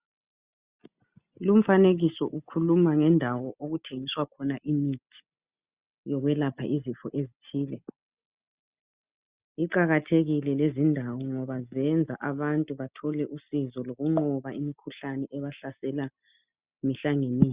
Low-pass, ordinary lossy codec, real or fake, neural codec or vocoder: 3.6 kHz; Opus, 64 kbps; real; none